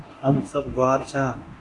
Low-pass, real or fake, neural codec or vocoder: 10.8 kHz; fake; codec, 24 kHz, 0.9 kbps, DualCodec